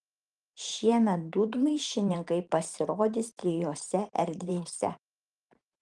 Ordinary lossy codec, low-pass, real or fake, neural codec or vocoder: Opus, 24 kbps; 10.8 kHz; fake; vocoder, 44.1 kHz, 128 mel bands every 512 samples, BigVGAN v2